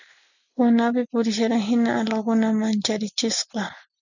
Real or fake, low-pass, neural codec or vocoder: real; 7.2 kHz; none